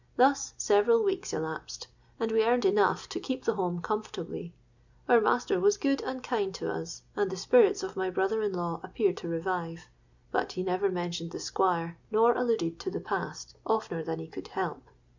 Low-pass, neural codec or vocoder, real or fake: 7.2 kHz; none; real